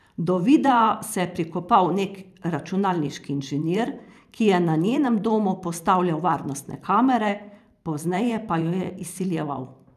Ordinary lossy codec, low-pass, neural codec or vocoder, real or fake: none; 14.4 kHz; vocoder, 44.1 kHz, 128 mel bands every 512 samples, BigVGAN v2; fake